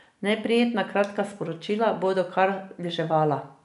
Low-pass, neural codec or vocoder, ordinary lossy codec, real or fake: 10.8 kHz; none; none; real